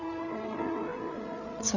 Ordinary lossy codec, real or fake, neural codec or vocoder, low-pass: none; fake; codec, 16 kHz, 16 kbps, FreqCodec, larger model; 7.2 kHz